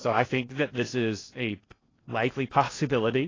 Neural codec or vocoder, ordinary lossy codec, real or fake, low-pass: codec, 16 kHz in and 24 kHz out, 0.6 kbps, FocalCodec, streaming, 2048 codes; AAC, 32 kbps; fake; 7.2 kHz